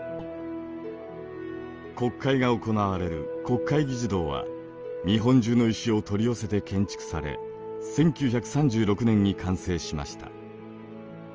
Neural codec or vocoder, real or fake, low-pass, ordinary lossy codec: none; real; 7.2 kHz; Opus, 24 kbps